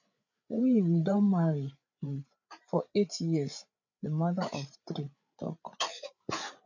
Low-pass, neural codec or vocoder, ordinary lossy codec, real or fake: 7.2 kHz; codec, 16 kHz, 8 kbps, FreqCodec, larger model; none; fake